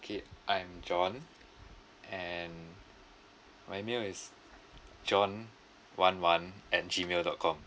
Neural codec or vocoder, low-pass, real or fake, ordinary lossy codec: none; none; real; none